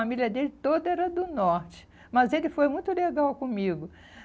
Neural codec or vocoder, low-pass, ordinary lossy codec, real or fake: none; none; none; real